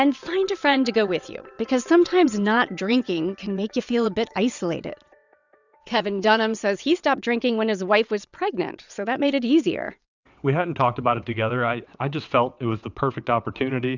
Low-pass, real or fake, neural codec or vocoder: 7.2 kHz; fake; vocoder, 22.05 kHz, 80 mel bands, WaveNeXt